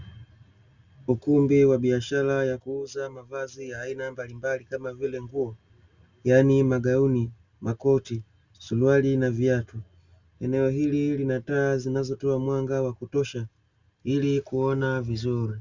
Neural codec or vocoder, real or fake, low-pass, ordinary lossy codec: none; real; 7.2 kHz; Opus, 64 kbps